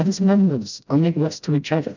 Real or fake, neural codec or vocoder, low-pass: fake; codec, 16 kHz, 0.5 kbps, FreqCodec, smaller model; 7.2 kHz